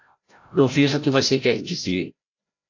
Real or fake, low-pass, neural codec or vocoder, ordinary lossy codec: fake; 7.2 kHz; codec, 16 kHz, 0.5 kbps, FreqCodec, larger model; AAC, 48 kbps